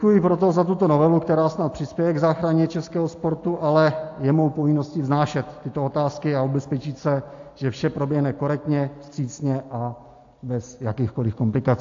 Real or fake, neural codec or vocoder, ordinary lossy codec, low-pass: real; none; AAC, 48 kbps; 7.2 kHz